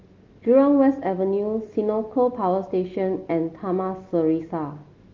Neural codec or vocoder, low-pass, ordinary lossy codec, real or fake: none; 7.2 kHz; Opus, 32 kbps; real